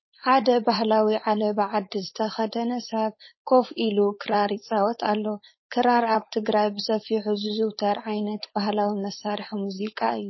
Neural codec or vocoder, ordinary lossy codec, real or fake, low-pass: codec, 16 kHz, 4.8 kbps, FACodec; MP3, 24 kbps; fake; 7.2 kHz